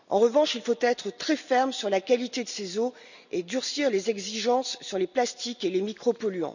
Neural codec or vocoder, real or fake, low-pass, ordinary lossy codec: none; real; 7.2 kHz; none